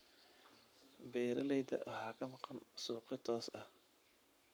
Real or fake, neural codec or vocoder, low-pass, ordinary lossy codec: fake; codec, 44.1 kHz, 7.8 kbps, Pupu-Codec; none; none